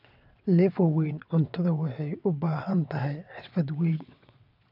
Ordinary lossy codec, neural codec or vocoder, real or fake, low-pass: none; vocoder, 22.05 kHz, 80 mel bands, WaveNeXt; fake; 5.4 kHz